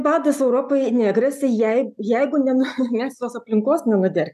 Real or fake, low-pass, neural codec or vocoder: real; 14.4 kHz; none